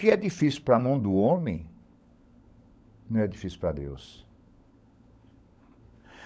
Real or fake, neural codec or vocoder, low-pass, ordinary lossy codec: fake; codec, 16 kHz, 16 kbps, FunCodec, trained on LibriTTS, 50 frames a second; none; none